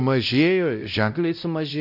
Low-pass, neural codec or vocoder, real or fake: 5.4 kHz; codec, 16 kHz, 0.5 kbps, X-Codec, WavLM features, trained on Multilingual LibriSpeech; fake